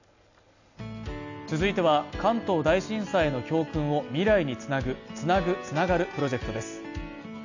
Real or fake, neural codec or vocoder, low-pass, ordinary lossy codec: real; none; 7.2 kHz; none